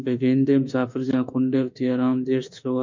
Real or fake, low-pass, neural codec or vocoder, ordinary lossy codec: fake; 7.2 kHz; autoencoder, 48 kHz, 32 numbers a frame, DAC-VAE, trained on Japanese speech; MP3, 64 kbps